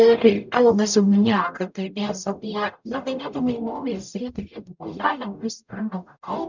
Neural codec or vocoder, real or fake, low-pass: codec, 44.1 kHz, 0.9 kbps, DAC; fake; 7.2 kHz